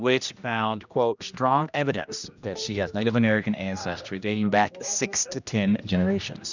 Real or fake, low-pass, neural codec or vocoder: fake; 7.2 kHz; codec, 16 kHz, 1 kbps, X-Codec, HuBERT features, trained on general audio